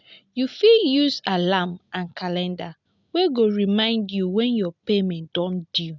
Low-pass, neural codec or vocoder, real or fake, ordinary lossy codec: 7.2 kHz; none; real; none